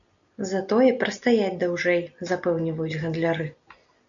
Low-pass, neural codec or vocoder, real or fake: 7.2 kHz; none; real